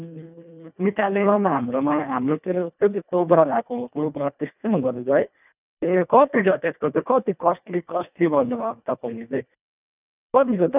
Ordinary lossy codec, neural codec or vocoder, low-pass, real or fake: none; codec, 24 kHz, 1.5 kbps, HILCodec; 3.6 kHz; fake